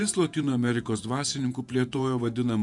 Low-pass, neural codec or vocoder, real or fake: 10.8 kHz; vocoder, 48 kHz, 128 mel bands, Vocos; fake